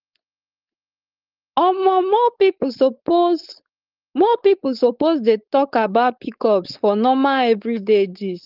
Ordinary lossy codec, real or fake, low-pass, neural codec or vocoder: Opus, 32 kbps; fake; 5.4 kHz; codec, 16 kHz, 4.8 kbps, FACodec